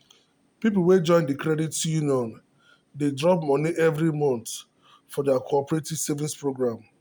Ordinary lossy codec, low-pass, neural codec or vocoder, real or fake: none; none; none; real